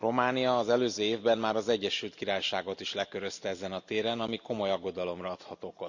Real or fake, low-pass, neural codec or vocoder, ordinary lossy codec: real; 7.2 kHz; none; none